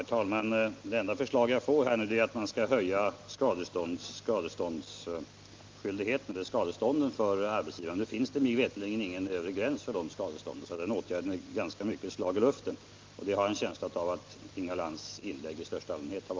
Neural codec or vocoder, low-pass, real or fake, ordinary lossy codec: none; 7.2 kHz; real; Opus, 24 kbps